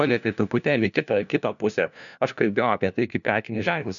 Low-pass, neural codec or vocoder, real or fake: 7.2 kHz; codec, 16 kHz, 1 kbps, FunCodec, trained on LibriTTS, 50 frames a second; fake